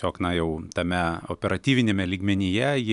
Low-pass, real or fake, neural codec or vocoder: 10.8 kHz; real; none